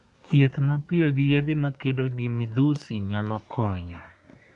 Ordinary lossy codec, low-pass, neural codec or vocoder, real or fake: none; 10.8 kHz; codec, 24 kHz, 1 kbps, SNAC; fake